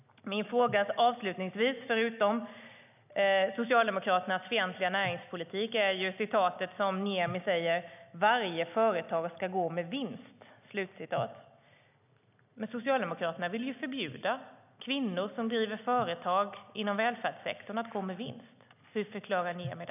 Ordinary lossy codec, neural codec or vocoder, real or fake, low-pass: none; none; real; 3.6 kHz